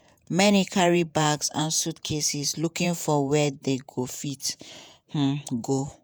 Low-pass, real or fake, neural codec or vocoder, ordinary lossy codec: none; fake; vocoder, 48 kHz, 128 mel bands, Vocos; none